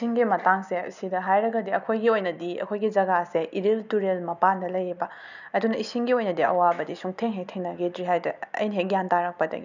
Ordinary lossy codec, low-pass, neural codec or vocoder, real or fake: none; 7.2 kHz; none; real